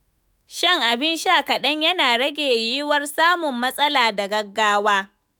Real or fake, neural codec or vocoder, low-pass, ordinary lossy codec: fake; autoencoder, 48 kHz, 128 numbers a frame, DAC-VAE, trained on Japanese speech; none; none